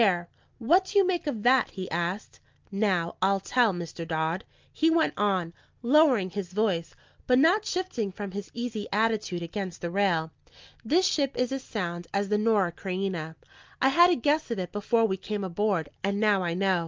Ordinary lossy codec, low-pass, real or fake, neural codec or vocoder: Opus, 24 kbps; 7.2 kHz; real; none